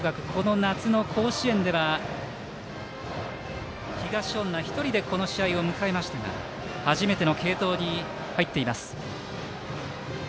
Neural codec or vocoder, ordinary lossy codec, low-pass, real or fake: none; none; none; real